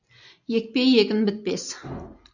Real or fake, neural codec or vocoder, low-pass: real; none; 7.2 kHz